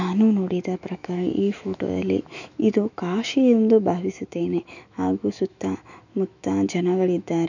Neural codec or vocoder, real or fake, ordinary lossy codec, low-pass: autoencoder, 48 kHz, 128 numbers a frame, DAC-VAE, trained on Japanese speech; fake; none; 7.2 kHz